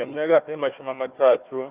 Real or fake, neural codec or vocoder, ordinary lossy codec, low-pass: fake; codec, 16 kHz, 1 kbps, FunCodec, trained on Chinese and English, 50 frames a second; Opus, 24 kbps; 3.6 kHz